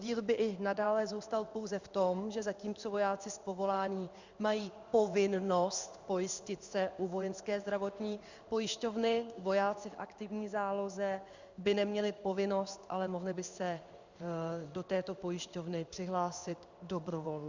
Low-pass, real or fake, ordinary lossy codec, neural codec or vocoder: 7.2 kHz; fake; Opus, 64 kbps; codec, 16 kHz in and 24 kHz out, 1 kbps, XY-Tokenizer